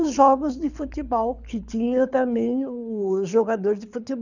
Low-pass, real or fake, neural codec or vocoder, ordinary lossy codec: 7.2 kHz; fake; codec, 24 kHz, 6 kbps, HILCodec; none